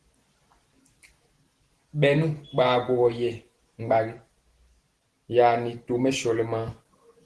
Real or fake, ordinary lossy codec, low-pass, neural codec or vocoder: real; Opus, 16 kbps; 10.8 kHz; none